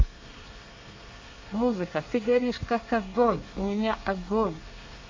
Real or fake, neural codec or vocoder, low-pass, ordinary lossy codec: fake; codec, 24 kHz, 1 kbps, SNAC; 7.2 kHz; MP3, 48 kbps